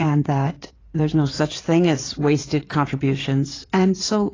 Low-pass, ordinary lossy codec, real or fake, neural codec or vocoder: 7.2 kHz; AAC, 32 kbps; fake; codec, 16 kHz in and 24 kHz out, 2.2 kbps, FireRedTTS-2 codec